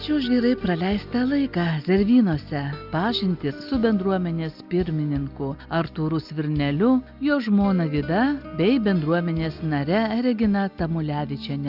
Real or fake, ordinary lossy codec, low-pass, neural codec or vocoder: real; Opus, 64 kbps; 5.4 kHz; none